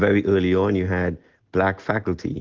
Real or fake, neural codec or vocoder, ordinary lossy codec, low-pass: real; none; Opus, 16 kbps; 7.2 kHz